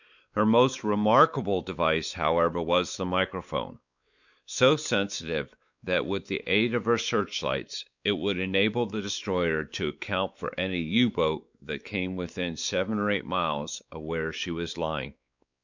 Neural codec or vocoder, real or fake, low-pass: codec, 16 kHz, 4 kbps, X-Codec, WavLM features, trained on Multilingual LibriSpeech; fake; 7.2 kHz